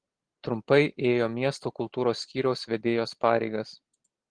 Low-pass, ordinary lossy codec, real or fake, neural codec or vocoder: 9.9 kHz; Opus, 16 kbps; real; none